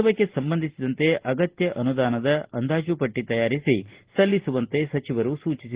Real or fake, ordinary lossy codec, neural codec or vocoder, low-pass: real; Opus, 16 kbps; none; 3.6 kHz